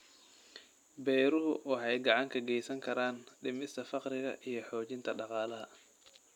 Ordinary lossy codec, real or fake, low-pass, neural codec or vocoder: none; real; 19.8 kHz; none